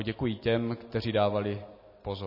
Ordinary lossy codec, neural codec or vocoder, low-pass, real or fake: MP3, 24 kbps; none; 5.4 kHz; real